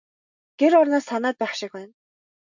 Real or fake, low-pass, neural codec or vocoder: real; 7.2 kHz; none